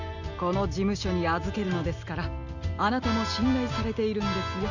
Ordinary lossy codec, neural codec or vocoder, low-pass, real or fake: none; none; 7.2 kHz; real